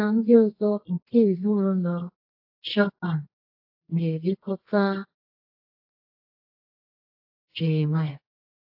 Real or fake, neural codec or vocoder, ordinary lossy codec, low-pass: fake; codec, 24 kHz, 0.9 kbps, WavTokenizer, medium music audio release; AAC, 48 kbps; 5.4 kHz